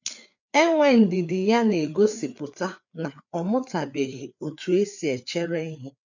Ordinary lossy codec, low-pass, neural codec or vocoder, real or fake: none; 7.2 kHz; codec, 16 kHz, 4 kbps, FreqCodec, larger model; fake